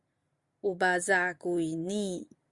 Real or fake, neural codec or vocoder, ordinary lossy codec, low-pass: real; none; Opus, 64 kbps; 10.8 kHz